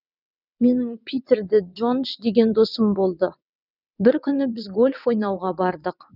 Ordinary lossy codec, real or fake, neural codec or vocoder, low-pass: none; fake; vocoder, 22.05 kHz, 80 mel bands, WaveNeXt; 5.4 kHz